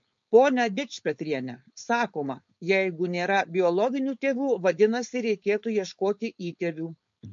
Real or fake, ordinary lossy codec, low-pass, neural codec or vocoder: fake; MP3, 48 kbps; 7.2 kHz; codec, 16 kHz, 4.8 kbps, FACodec